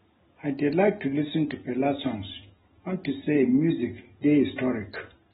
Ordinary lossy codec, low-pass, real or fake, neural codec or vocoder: AAC, 16 kbps; 19.8 kHz; real; none